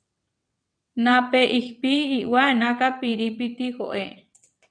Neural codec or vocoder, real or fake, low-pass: vocoder, 22.05 kHz, 80 mel bands, WaveNeXt; fake; 9.9 kHz